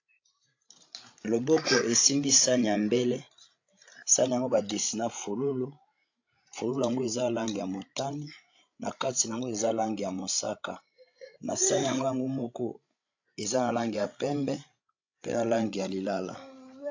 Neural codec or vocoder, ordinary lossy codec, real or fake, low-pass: codec, 16 kHz, 16 kbps, FreqCodec, larger model; AAC, 48 kbps; fake; 7.2 kHz